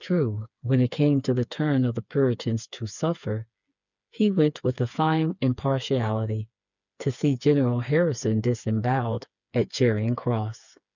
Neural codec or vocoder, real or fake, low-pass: codec, 16 kHz, 4 kbps, FreqCodec, smaller model; fake; 7.2 kHz